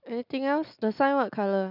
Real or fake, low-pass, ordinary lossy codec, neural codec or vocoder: real; 5.4 kHz; none; none